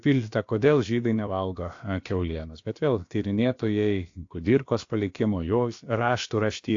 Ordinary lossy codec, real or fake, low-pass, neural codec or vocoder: AAC, 48 kbps; fake; 7.2 kHz; codec, 16 kHz, about 1 kbps, DyCAST, with the encoder's durations